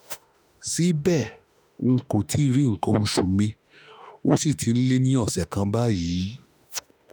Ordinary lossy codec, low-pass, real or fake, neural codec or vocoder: none; none; fake; autoencoder, 48 kHz, 32 numbers a frame, DAC-VAE, trained on Japanese speech